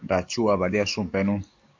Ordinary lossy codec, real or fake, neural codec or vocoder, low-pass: MP3, 64 kbps; fake; codec, 44.1 kHz, 7.8 kbps, DAC; 7.2 kHz